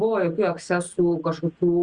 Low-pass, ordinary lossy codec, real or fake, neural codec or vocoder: 9.9 kHz; Opus, 64 kbps; real; none